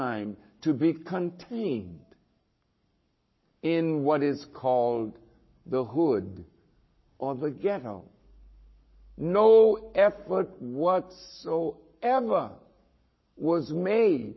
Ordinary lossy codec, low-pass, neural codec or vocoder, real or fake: MP3, 24 kbps; 7.2 kHz; codec, 44.1 kHz, 7.8 kbps, Pupu-Codec; fake